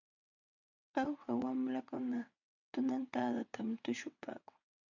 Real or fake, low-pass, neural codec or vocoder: fake; 7.2 kHz; vocoder, 22.05 kHz, 80 mel bands, WaveNeXt